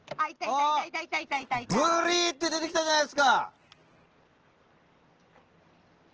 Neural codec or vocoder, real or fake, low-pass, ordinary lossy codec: none; real; 7.2 kHz; Opus, 16 kbps